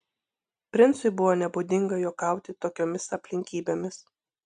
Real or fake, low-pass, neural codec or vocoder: real; 9.9 kHz; none